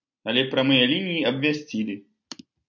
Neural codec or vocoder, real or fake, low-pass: none; real; 7.2 kHz